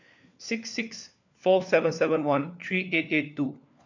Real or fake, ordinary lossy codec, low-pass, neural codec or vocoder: fake; none; 7.2 kHz; codec, 16 kHz, 4 kbps, FunCodec, trained on LibriTTS, 50 frames a second